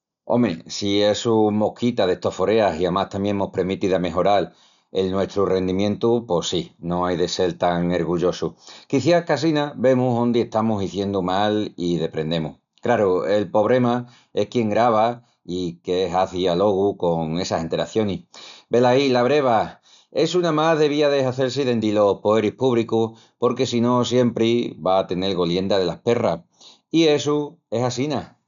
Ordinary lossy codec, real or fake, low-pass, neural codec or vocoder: MP3, 96 kbps; real; 7.2 kHz; none